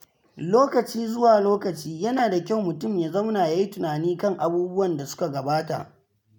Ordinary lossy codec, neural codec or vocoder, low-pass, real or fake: none; none; 19.8 kHz; real